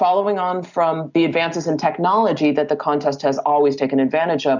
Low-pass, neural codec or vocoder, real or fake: 7.2 kHz; none; real